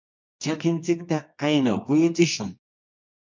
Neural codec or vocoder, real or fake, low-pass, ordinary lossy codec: codec, 24 kHz, 0.9 kbps, WavTokenizer, medium music audio release; fake; 7.2 kHz; MP3, 64 kbps